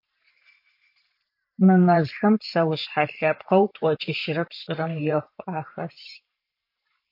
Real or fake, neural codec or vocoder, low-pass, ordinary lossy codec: fake; vocoder, 44.1 kHz, 128 mel bands every 512 samples, BigVGAN v2; 5.4 kHz; MP3, 48 kbps